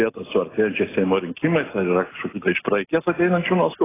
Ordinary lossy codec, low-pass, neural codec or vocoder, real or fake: AAC, 16 kbps; 3.6 kHz; none; real